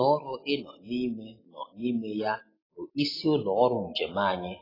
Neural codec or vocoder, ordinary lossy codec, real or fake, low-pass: none; AAC, 24 kbps; real; 5.4 kHz